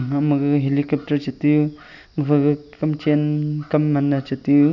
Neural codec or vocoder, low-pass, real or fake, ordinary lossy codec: none; 7.2 kHz; real; none